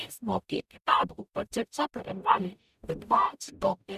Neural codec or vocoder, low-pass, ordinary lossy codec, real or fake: codec, 44.1 kHz, 0.9 kbps, DAC; 14.4 kHz; none; fake